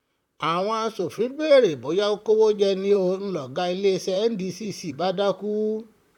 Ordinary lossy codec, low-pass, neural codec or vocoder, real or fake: none; 19.8 kHz; vocoder, 44.1 kHz, 128 mel bands, Pupu-Vocoder; fake